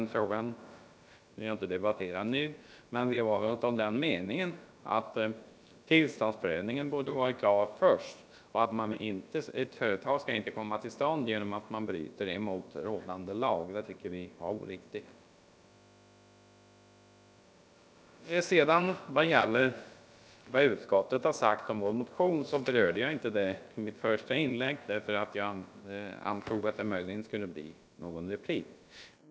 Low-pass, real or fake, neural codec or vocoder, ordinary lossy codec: none; fake; codec, 16 kHz, about 1 kbps, DyCAST, with the encoder's durations; none